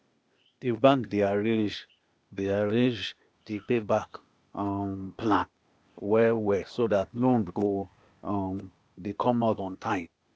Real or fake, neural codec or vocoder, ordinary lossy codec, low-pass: fake; codec, 16 kHz, 0.8 kbps, ZipCodec; none; none